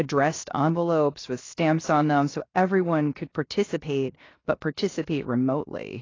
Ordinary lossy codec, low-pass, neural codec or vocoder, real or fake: AAC, 32 kbps; 7.2 kHz; codec, 16 kHz, 0.7 kbps, FocalCodec; fake